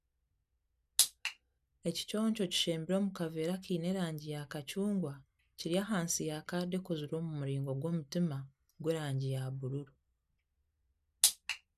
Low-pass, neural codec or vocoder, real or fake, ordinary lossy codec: 14.4 kHz; none; real; none